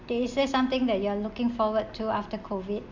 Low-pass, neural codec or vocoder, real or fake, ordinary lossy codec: 7.2 kHz; none; real; Opus, 64 kbps